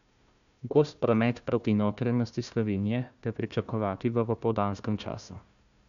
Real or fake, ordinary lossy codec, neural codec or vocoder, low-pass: fake; none; codec, 16 kHz, 1 kbps, FunCodec, trained on Chinese and English, 50 frames a second; 7.2 kHz